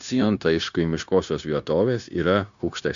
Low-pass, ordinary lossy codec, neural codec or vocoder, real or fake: 7.2 kHz; MP3, 64 kbps; codec, 16 kHz, 0.9 kbps, LongCat-Audio-Codec; fake